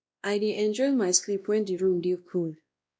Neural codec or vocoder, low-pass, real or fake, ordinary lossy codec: codec, 16 kHz, 1 kbps, X-Codec, WavLM features, trained on Multilingual LibriSpeech; none; fake; none